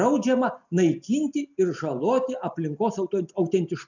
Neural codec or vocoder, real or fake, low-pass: none; real; 7.2 kHz